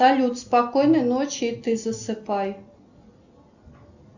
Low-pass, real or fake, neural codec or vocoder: 7.2 kHz; real; none